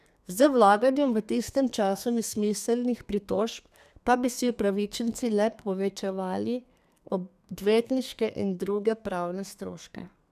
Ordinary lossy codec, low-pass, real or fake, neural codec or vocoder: none; 14.4 kHz; fake; codec, 32 kHz, 1.9 kbps, SNAC